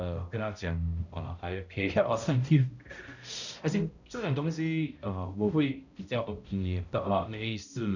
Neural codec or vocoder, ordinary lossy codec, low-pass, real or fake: codec, 16 kHz, 0.5 kbps, X-Codec, HuBERT features, trained on balanced general audio; none; 7.2 kHz; fake